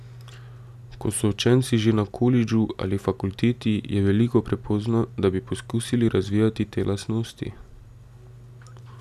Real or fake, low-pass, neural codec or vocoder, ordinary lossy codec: real; 14.4 kHz; none; none